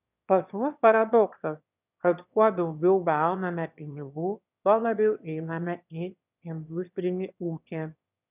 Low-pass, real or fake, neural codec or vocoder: 3.6 kHz; fake; autoencoder, 22.05 kHz, a latent of 192 numbers a frame, VITS, trained on one speaker